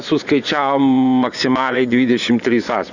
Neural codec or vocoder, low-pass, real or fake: vocoder, 24 kHz, 100 mel bands, Vocos; 7.2 kHz; fake